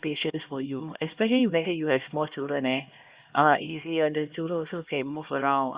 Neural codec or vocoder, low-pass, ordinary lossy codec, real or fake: codec, 16 kHz, 1 kbps, X-Codec, HuBERT features, trained on LibriSpeech; 3.6 kHz; Opus, 64 kbps; fake